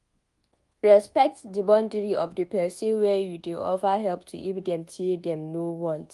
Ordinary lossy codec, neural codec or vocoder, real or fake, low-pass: Opus, 24 kbps; codec, 24 kHz, 1.2 kbps, DualCodec; fake; 10.8 kHz